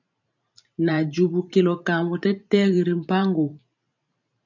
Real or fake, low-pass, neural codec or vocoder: fake; 7.2 kHz; vocoder, 44.1 kHz, 128 mel bands every 512 samples, BigVGAN v2